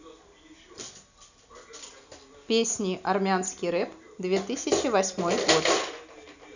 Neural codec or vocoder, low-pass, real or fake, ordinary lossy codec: none; 7.2 kHz; real; none